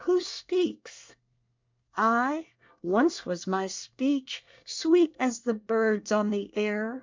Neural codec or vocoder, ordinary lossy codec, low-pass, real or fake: codec, 24 kHz, 1 kbps, SNAC; MP3, 64 kbps; 7.2 kHz; fake